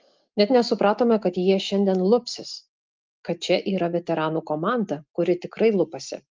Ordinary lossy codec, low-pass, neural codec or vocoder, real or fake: Opus, 32 kbps; 7.2 kHz; none; real